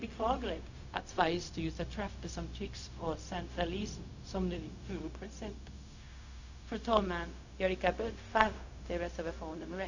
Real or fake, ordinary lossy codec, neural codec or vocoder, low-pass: fake; none; codec, 16 kHz, 0.4 kbps, LongCat-Audio-Codec; 7.2 kHz